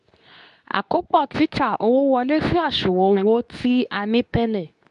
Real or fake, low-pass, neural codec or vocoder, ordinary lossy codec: fake; 10.8 kHz; codec, 24 kHz, 0.9 kbps, WavTokenizer, medium speech release version 2; none